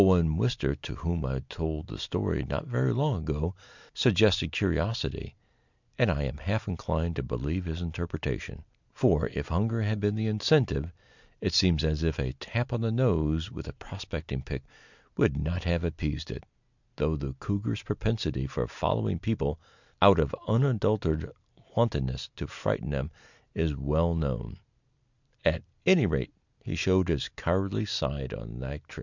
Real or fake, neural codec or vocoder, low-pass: real; none; 7.2 kHz